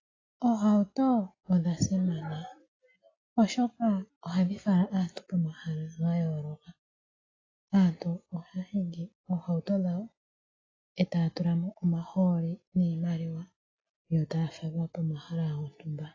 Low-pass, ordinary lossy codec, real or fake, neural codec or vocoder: 7.2 kHz; AAC, 32 kbps; real; none